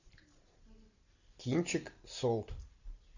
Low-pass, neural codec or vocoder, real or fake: 7.2 kHz; none; real